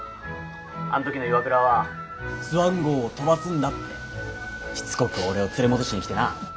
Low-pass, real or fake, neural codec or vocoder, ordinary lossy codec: none; real; none; none